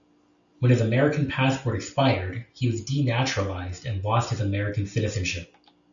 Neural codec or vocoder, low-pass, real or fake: none; 7.2 kHz; real